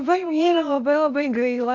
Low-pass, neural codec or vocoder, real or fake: 7.2 kHz; codec, 16 kHz, 0.8 kbps, ZipCodec; fake